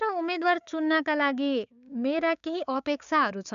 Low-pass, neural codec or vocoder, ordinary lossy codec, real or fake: 7.2 kHz; codec, 16 kHz, 6 kbps, DAC; none; fake